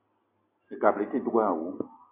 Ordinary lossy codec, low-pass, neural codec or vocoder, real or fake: MP3, 24 kbps; 3.6 kHz; none; real